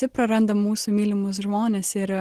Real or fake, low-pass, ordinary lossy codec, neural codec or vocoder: real; 14.4 kHz; Opus, 16 kbps; none